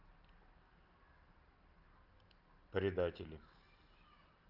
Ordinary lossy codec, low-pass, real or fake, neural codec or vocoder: Opus, 24 kbps; 5.4 kHz; real; none